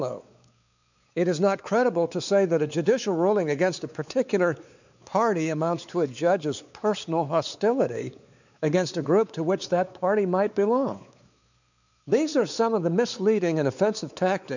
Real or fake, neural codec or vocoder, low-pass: fake; codec, 16 kHz, 4 kbps, X-Codec, WavLM features, trained on Multilingual LibriSpeech; 7.2 kHz